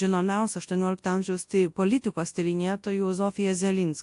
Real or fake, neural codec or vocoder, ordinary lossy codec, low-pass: fake; codec, 24 kHz, 0.9 kbps, WavTokenizer, large speech release; AAC, 64 kbps; 10.8 kHz